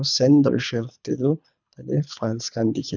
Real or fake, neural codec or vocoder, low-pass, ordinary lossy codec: fake; codec, 24 kHz, 3 kbps, HILCodec; 7.2 kHz; none